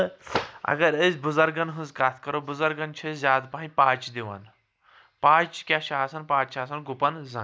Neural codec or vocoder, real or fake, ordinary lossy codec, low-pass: none; real; none; none